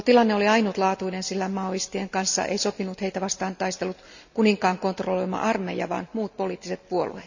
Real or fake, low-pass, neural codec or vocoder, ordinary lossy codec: real; 7.2 kHz; none; none